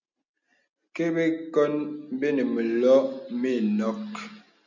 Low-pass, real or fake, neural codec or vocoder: 7.2 kHz; real; none